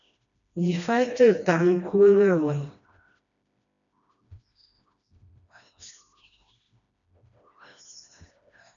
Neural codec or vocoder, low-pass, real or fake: codec, 16 kHz, 1 kbps, FreqCodec, smaller model; 7.2 kHz; fake